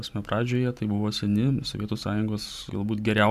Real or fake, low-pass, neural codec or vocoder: fake; 14.4 kHz; vocoder, 44.1 kHz, 128 mel bands every 512 samples, BigVGAN v2